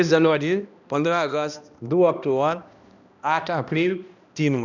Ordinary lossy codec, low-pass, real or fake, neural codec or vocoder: none; 7.2 kHz; fake; codec, 16 kHz, 1 kbps, X-Codec, HuBERT features, trained on balanced general audio